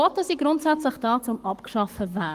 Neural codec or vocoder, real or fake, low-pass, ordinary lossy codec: codec, 44.1 kHz, 7.8 kbps, Pupu-Codec; fake; 14.4 kHz; Opus, 24 kbps